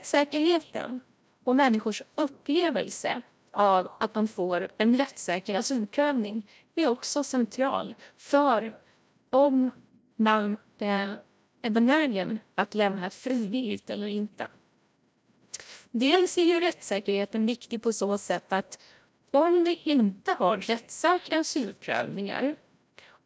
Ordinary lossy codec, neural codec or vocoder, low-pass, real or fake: none; codec, 16 kHz, 0.5 kbps, FreqCodec, larger model; none; fake